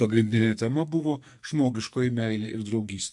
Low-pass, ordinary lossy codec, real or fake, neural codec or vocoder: 10.8 kHz; MP3, 64 kbps; fake; codec, 44.1 kHz, 2.6 kbps, SNAC